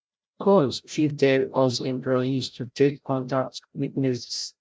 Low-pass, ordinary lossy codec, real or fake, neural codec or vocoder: none; none; fake; codec, 16 kHz, 0.5 kbps, FreqCodec, larger model